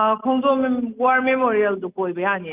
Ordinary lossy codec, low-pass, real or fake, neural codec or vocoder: Opus, 24 kbps; 3.6 kHz; real; none